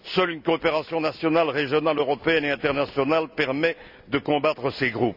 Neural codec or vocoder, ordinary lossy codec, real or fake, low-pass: none; none; real; 5.4 kHz